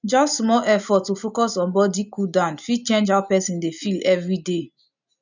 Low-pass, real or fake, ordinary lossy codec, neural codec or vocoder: 7.2 kHz; real; none; none